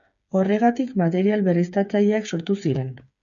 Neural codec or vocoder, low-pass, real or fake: codec, 16 kHz, 8 kbps, FreqCodec, smaller model; 7.2 kHz; fake